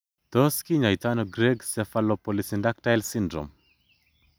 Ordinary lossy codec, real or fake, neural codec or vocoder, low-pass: none; real; none; none